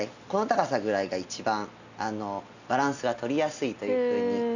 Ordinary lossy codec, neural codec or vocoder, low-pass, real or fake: none; none; 7.2 kHz; real